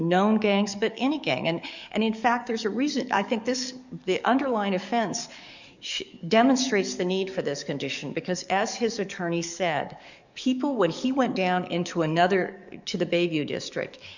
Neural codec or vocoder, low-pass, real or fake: codec, 44.1 kHz, 7.8 kbps, DAC; 7.2 kHz; fake